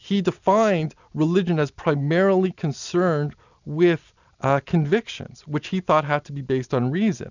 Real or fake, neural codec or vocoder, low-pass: real; none; 7.2 kHz